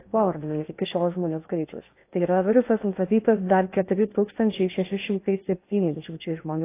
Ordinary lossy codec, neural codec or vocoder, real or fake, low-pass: AAC, 24 kbps; codec, 16 kHz in and 24 kHz out, 0.6 kbps, FocalCodec, streaming, 2048 codes; fake; 3.6 kHz